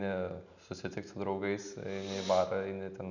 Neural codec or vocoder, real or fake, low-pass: none; real; 7.2 kHz